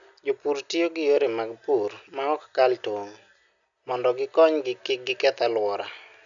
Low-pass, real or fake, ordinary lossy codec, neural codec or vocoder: 7.2 kHz; real; none; none